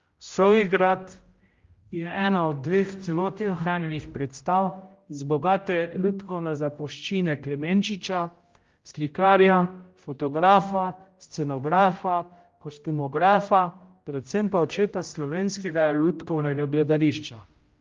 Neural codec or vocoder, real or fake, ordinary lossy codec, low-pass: codec, 16 kHz, 0.5 kbps, X-Codec, HuBERT features, trained on general audio; fake; Opus, 32 kbps; 7.2 kHz